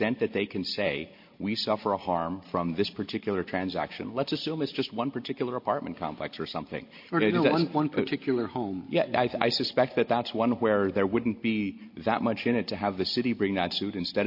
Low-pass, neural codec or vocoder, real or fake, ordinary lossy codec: 5.4 kHz; none; real; MP3, 32 kbps